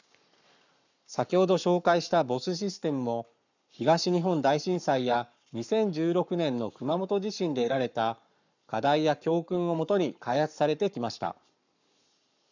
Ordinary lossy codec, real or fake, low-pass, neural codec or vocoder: none; fake; 7.2 kHz; codec, 44.1 kHz, 7.8 kbps, Pupu-Codec